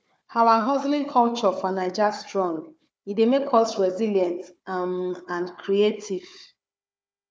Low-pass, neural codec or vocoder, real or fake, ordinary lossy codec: none; codec, 16 kHz, 4 kbps, FunCodec, trained on Chinese and English, 50 frames a second; fake; none